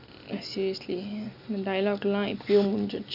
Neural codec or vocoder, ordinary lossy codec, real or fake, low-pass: none; none; real; 5.4 kHz